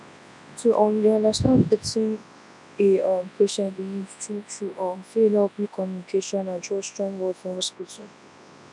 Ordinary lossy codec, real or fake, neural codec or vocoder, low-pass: none; fake; codec, 24 kHz, 0.9 kbps, WavTokenizer, large speech release; 10.8 kHz